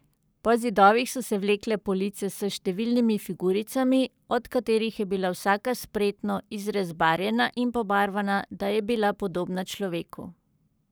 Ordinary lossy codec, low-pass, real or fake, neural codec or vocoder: none; none; fake; codec, 44.1 kHz, 7.8 kbps, Pupu-Codec